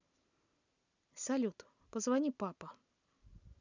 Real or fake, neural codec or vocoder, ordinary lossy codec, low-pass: real; none; none; 7.2 kHz